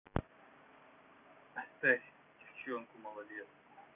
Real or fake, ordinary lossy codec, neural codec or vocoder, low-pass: real; none; none; 3.6 kHz